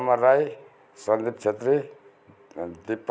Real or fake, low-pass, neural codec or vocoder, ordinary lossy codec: real; none; none; none